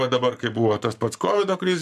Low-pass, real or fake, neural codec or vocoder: 14.4 kHz; fake; codec, 44.1 kHz, 7.8 kbps, Pupu-Codec